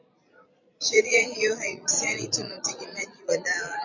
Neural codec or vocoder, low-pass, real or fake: vocoder, 22.05 kHz, 80 mel bands, Vocos; 7.2 kHz; fake